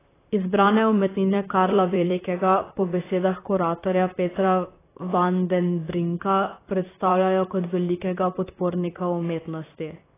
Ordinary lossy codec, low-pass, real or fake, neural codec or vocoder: AAC, 16 kbps; 3.6 kHz; fake; vocoder, 44.1 kHz, 128 mel bands, Pupu-Vocoder